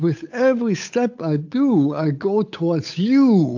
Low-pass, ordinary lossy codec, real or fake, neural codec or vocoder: 7.2 kHz; AAC, 48 kbps; fake; codec, 16 kHz, 8 kbps, FunCodec, trained on Chinese and English, 25 frames a second